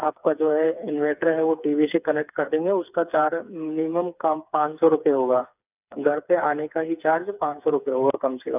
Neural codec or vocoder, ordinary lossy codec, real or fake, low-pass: codec, 16 kHz, 4 kbps, FreqCodec, smaller model; none; fake; 3.6 kHz